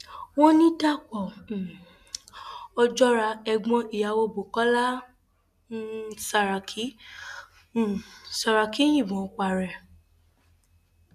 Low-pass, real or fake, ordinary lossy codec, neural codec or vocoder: 14.4 kHz; real; none; none